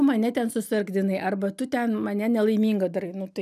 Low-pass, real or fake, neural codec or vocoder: 14.4 kHz; real; none